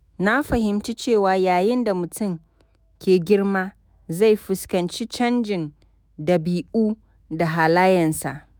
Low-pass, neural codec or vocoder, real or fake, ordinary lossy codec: none; autoencoder, 48 kHz, 128 numbers a frame, DAC-VAE, trained on Japanese speech; fake; none